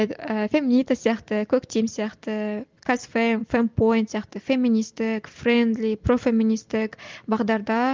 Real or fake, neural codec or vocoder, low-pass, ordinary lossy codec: real; none; 7.2 kHz; Opus, 32 kbps